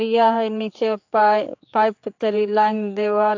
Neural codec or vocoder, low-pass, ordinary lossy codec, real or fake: codec, 16 kHz, 4 kbps, X-Codec, HuBERT features, trained on general audio; 7.2 kHz; AAC, 48 kbps; fake